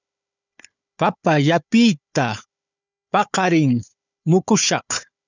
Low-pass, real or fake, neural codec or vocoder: 7.2 kHz; fake; codec, 16 kHz, 4 kbps, FunCodec, trained on Chinese and English, 50 frames a second